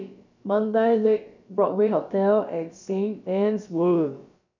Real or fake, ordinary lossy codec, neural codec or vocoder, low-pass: fake; none; codec, 16 kHz, about 1 kbps, DyCAST, with the encoder's durations; 7.2 kHz